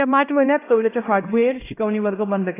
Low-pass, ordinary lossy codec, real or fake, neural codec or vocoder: 3.6 kHz; AAC, 16 kbps; fake; codec, 16 kHz, 1 kbps, X-Codec, HuBERT features, trained on LibriSpeech